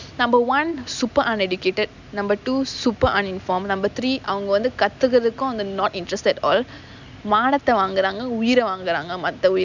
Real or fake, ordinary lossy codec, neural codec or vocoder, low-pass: real; none; none; 7.2 kHz